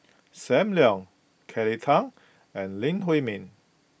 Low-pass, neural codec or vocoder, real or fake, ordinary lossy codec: none; none; real; none